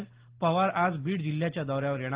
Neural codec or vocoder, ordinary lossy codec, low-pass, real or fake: none; Opus, 16 kbps; 3.6 kHz; real